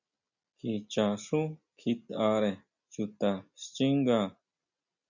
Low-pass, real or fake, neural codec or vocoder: 7.2 kHz; real; none